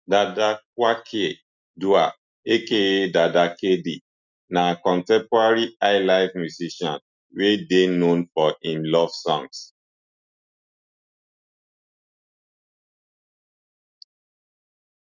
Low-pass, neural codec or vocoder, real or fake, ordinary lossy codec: 7.2 kHz; none; real; none